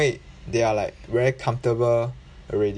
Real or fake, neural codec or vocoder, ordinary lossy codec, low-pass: real; none; AAC, 64 kbps; 9.9 kHz